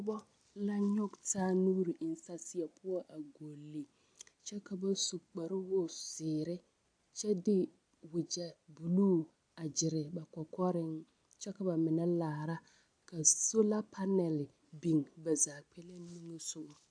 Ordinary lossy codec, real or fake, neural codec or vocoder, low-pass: AAC, 64 kbps; real; none; 9.9 kHz